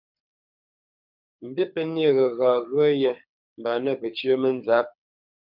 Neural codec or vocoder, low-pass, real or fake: codec, 24 kHz, 6 kbps, HILCodec; 5.4 kHz; fake